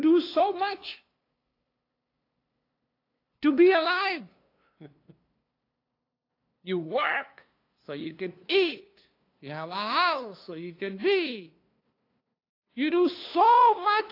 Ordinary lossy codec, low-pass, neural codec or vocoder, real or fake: AAC, 32 kbps; 5.4 kHz; codec, 16 kHz, 2 kbps, FunCodec, trained on LibriTTS, 25 frames a second; fake